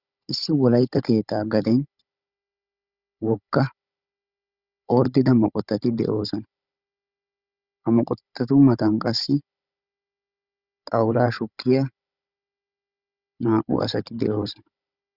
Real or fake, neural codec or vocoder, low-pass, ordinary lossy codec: fake; codec, 16 kHz, 16 kbps, FunCodec, trained on Chinese and English, 50 frames a second; 5.4 kHz; Opus, 64 kbps